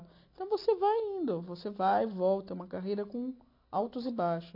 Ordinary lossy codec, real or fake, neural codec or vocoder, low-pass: AAC, 32 kbps; real; none; 5.4 kHz